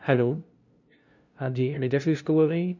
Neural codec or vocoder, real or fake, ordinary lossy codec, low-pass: codec, 16 kHz, 0.5 kbps, FunCodec, trained on LibriTTS, 25 frames a second; fake; none; 7.2 kHz